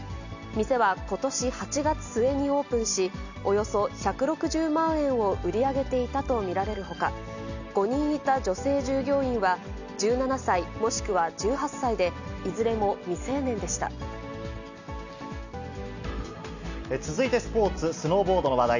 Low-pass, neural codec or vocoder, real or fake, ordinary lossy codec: 7.2 kHz; none; real; none